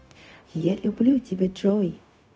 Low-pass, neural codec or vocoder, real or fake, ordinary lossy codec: none; codec, 16 kHz, 0.4 kbps, LongCat-Audio-Codec; fake; none